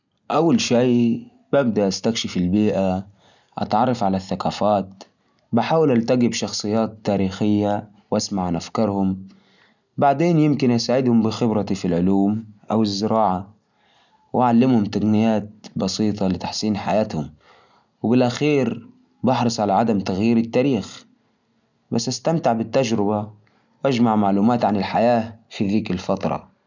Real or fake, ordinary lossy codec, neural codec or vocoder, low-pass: real; none; none; 7.2 kHz